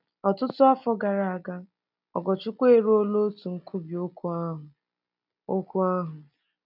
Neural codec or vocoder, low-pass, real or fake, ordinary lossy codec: none; 5.4 kHz; real; none